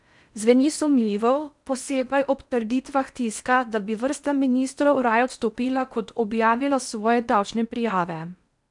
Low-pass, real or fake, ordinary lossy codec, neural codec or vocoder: 10.8 kHz; fake; none; codec, 16 kHz in and 24 kHz out, 0.8 kbps, FocalCodec, streaming, 65536 codes